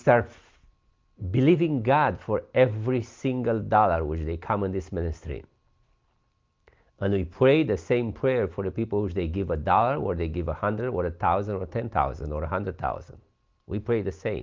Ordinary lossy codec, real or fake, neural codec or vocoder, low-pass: Opus, 32 kbps; real; none; 7.2 kHz